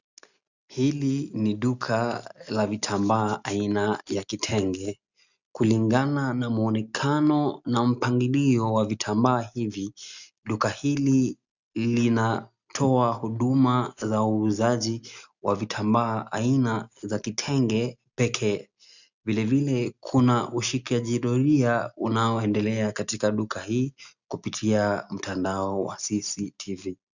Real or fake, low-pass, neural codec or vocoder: real; 7.2 kHz; none